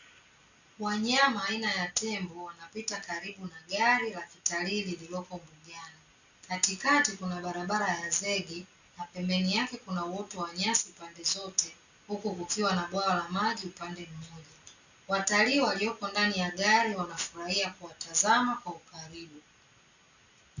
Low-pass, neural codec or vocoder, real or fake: 7.2 kHz; none; real